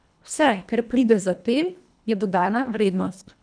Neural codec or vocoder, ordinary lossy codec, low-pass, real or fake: codec, 24 kHz, 1.5 kbps, HILCodec; none; 9.9 kHz; fake